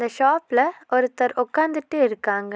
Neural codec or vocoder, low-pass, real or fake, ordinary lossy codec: none; none; real; none